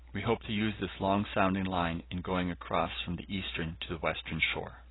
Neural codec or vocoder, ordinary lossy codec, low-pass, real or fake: none; AAC, 16 kbps; 7.2 kHz; real